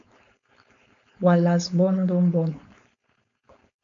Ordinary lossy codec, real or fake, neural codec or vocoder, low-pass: AAC, 64 kbps; fake; codec, 16 kHz, 4.8 kbps, FACodec; 7.2 kHz